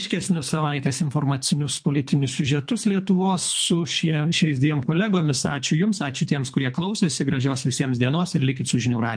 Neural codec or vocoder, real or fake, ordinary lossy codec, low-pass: codec, 24 kHz, 3 kbps, HILCodec; fake; MP3, 64 kbps; 9.9 kHz